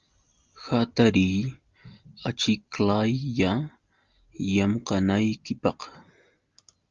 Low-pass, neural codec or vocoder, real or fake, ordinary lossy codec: 7.2 kHz; none; real; Opus, 32 kbps